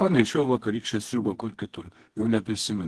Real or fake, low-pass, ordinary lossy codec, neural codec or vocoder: fake; 10.8 kHz; Opus, 16 kbps; codec, 24 kHz, 0.9 kbps, WavTokenizer, medium music audio release